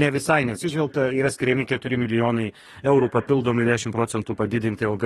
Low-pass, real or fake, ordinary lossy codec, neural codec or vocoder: 14.4 kHz; fake; AAC, 32 kbps; codec, 32 kHz, 1.9 kbps, SNAC